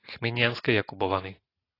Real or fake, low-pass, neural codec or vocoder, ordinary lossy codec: real; 5.4 kHz; none; AAC, 24 kbps